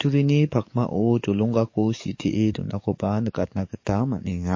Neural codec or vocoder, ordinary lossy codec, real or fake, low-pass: codec, 44.1 kHz, 7.8 kbps, DAC; MP3, 32 kbps; fake; 7.2 kHz